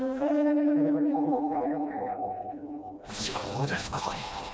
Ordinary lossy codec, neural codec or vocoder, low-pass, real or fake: none; codec, 16 kHz, 1 kbps, FreqCodec, smaller model; none; fake